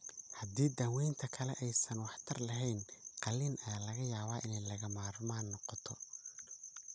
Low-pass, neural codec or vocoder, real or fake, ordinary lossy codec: none; none; real; none